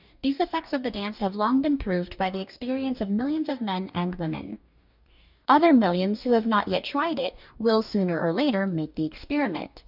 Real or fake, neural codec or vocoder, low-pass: fake; codec, 44.1 kHz, 2.6 kbps, DAC; 5.4 kHz